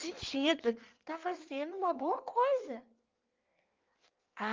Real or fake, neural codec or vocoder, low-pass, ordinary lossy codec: fake; codec, 16 kHz in and 24 kHz out, 1.1 kbps, FireRedTTS-2 codec; 7.2 kHz; Opus, 24 kbps